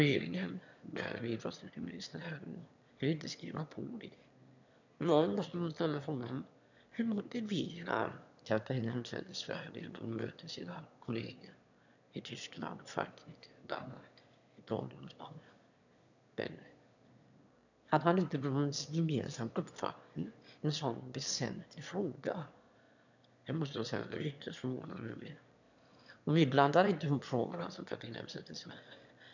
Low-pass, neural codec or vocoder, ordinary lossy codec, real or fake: 7.2 kHz; autoencoder, 22.05 kHz, a latent of 192 numbers a frame, VITS, trained on one speaker; none; fake